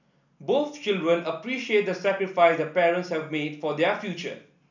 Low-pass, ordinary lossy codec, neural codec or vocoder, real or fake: 7.2 kHz; none; none; real